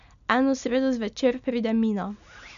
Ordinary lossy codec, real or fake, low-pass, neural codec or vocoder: none; real; 7.2 kHz; none